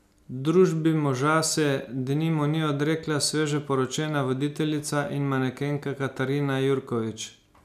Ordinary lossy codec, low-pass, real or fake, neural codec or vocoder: none; 14.4 kHz; real; none